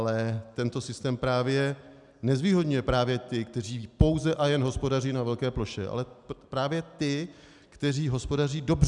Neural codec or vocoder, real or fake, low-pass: none; real; 10.8 kHz